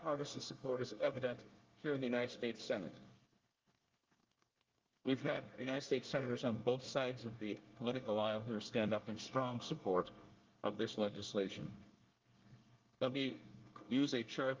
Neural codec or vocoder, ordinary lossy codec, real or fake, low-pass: codec, 24 kHz, 1 kbps, SNAC; Opus, 32 kbps; fake; 7.2 kHz